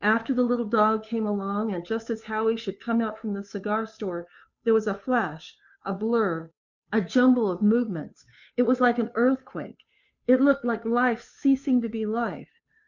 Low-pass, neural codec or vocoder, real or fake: 7.2 kHz; codec, 16 kHz, 2 kbps, FunCodec, trained on Chinese and English, 25 frames a second; fake